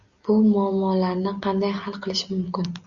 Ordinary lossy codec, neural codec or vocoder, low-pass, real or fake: Opus, 64 kbps; none; 7.2 kHz; real